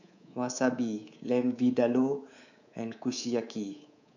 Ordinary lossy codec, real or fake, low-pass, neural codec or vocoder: none; fake; 7.2 kHz; codec, 24 kHz, 3.1 kbps, DualCodec